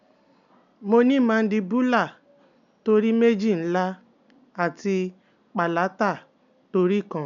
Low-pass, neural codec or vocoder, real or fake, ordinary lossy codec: 7.2 kHz; none; real; MP3, 96 kbps